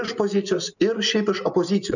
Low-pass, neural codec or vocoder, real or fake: 7.2 kHz; none; real